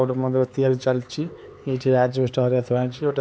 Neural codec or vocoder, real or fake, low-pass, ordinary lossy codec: codec, 16 kHz, 4 kbps, X-Codec, HuBERT features, trained on LibriSpeech; fake; none; none